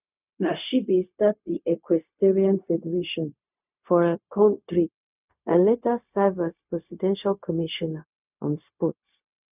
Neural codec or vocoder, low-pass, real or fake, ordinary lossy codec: codec, 16 kHz, 0.4 kbps, LongCat-Audio-Codec; 3.6 kHz; fake; none